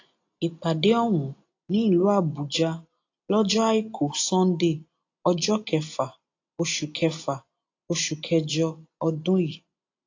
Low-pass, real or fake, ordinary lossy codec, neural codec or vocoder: 7.2 kHz; real; AAC, 48 kbps; none